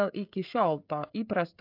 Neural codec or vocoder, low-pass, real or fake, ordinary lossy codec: codec, 16 kHz, 16 kbps, FreqCodec, smaller model; 5.4 kHz; fake; MP3, 48 kbps